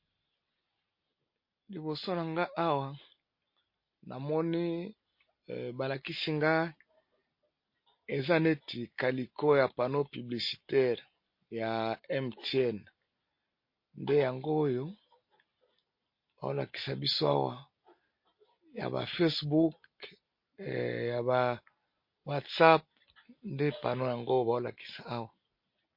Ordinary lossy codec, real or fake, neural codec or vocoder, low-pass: MP3, 32 kbps; real; none; 5.4 kHz